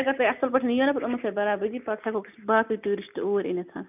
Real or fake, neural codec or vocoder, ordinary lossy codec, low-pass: real; none; none; 3.6 kHz